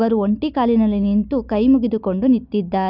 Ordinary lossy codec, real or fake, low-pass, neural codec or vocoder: none; real; 5.4 kHz; none